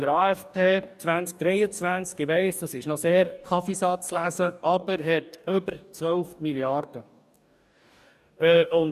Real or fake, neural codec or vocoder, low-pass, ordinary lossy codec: fake; codec, 44.1 kHz, 2.6 kbps, DAC; 14.4 kHz; none